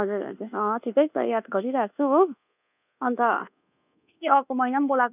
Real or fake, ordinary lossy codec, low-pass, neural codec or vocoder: fake; none; 3.6 kHz; codec, 24 kHz, 1.2 kbps, DualCodec